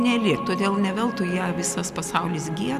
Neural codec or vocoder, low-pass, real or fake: none; 14.4 kHz; real